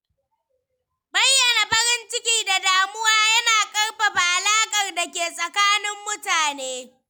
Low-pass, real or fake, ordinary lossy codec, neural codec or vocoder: none; real; none; none